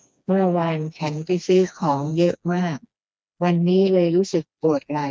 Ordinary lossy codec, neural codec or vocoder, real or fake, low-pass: none; codec, 16 kHz, 2 kbps, FreqCodec, smaller model; fake; none